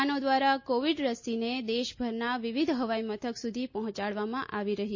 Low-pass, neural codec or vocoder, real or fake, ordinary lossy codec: 7.2 kHz; none; real; MP3, 32 kbps